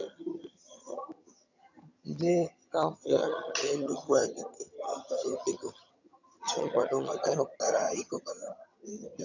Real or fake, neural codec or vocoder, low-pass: fake; vocoder, 22.05 kHz, 80 mel bands, HiFi-GAN; 7.2 kHz